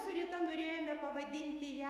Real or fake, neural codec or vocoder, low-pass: fake; autoencoder, 48 kHz, 128 numbers a frame, DAC-VAE, trained on Japanese speech; 14.4 kHz